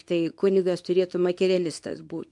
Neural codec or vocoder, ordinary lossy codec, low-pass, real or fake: codec, 24 kHz, 0.9 kbps, WavTokenizer, small release; MP3, 64 kbps; 10.8 kHz; fake